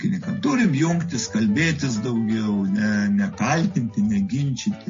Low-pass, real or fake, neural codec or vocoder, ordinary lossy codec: 7.2 kHz; real; none; MP3, 32 kbps